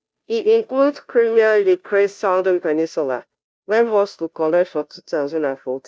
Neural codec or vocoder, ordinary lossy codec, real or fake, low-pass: codec, 16 kHz, 0.5 kbps, FunCodec, trained on Chinese and English, 25 frames a second; none; fake; none